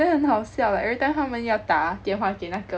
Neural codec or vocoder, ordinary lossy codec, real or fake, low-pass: none; none; real; none